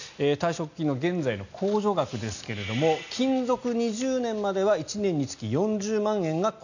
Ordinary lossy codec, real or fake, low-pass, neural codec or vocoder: none; real; 7.2 kHz; none